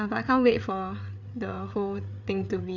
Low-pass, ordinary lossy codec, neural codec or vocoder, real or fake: 7.2 kHz; none; codec, 16 kHz, 8 kbps, FreqCodec, larger model; fake